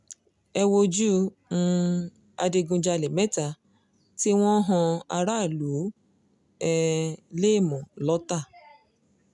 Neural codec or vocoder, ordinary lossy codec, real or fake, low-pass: none; none; real; 10.8 kHz